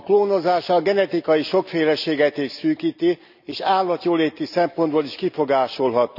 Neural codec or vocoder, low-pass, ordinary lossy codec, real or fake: none; 5.4 kHz; none; real